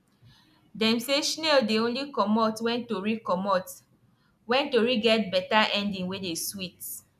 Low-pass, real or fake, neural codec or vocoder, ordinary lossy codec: 14.4 kHz; real; none; none